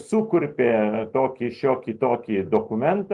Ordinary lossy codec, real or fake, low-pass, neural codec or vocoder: Opus, 24 kbps; real; 10.8 kHz; none